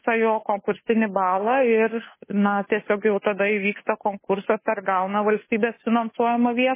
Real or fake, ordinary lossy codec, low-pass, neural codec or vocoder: fake; MP3, 16 kbps; 3.6 kHz; codec, 16 kHz, 2 kbps, FunCodec, trained on Chinese and English, 25 frames a second